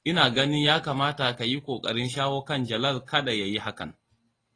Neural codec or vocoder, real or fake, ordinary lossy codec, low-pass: none; real; AAC, 32 kbps; 9.9 kHz